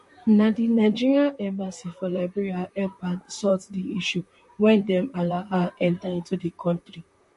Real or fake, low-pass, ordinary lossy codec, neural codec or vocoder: fake; 14.4 kHz; MP3, 48 kbps; vocoder, 44.1 kHz, 128 mel bands, Pupu-Vocoder